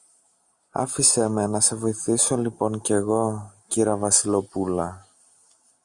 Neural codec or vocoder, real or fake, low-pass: none; real; 10.8 kHz